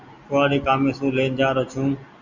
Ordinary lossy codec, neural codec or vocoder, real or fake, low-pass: Opus, 64 kbps; none; real; 7.2 kHz